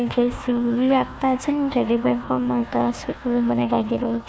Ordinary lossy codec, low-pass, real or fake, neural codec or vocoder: none; none; fake; codec, 16 kHz, 1 kbps, FunCodec, trained on Chinese and English, 50 frames a second